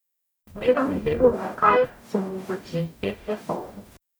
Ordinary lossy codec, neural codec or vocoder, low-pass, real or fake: none; codec, 44.1 kHz, 0.9 kbps, DAC; none; fake